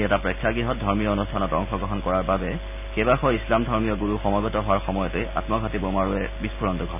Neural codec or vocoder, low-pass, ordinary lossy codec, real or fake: none; 3.6 kHz; none; real